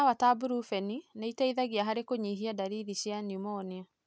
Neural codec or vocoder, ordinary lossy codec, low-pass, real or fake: none; none; none; real